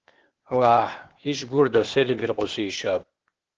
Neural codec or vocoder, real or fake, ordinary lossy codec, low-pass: codec, 16 kHz, 0.8 kbps, ZipCodec; fake; Opus, 16 kbps; 7.2 kHz